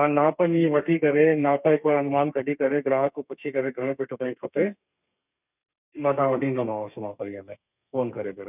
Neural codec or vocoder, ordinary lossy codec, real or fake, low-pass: codec, 32 kHz, 1.9 kbps, SNAC; none; fake; 3.6 kHz